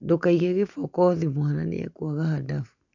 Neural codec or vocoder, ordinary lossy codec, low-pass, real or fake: none; none; 7.2 kHz; real